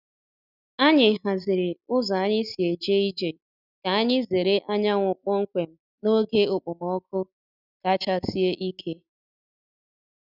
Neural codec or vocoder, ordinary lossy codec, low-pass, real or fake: none; none; 5.4 kHz; real